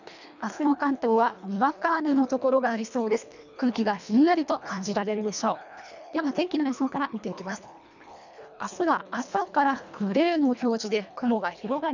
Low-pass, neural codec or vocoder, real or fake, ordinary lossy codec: 7.2 kHz; codec, 24 kHz, 1.5 kbps, HILCodec; fake; none